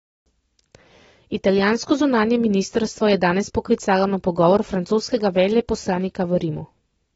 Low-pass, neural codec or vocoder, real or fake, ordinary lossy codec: 19.8 kHz; none; real; AAC, 24 kbps